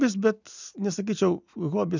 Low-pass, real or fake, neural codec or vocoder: 7.2 kHz; real; none